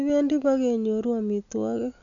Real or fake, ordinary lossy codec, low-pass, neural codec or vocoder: real; none; 7.2 kHz; none